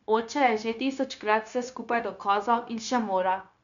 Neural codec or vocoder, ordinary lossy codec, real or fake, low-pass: codec, 16 kHz, 0.9 kbps, LongCat-Audio-Codec; none; fake; 7.2 kHz